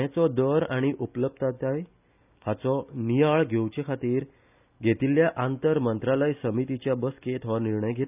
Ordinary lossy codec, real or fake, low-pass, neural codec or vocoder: none; real; 3.6 kHz; none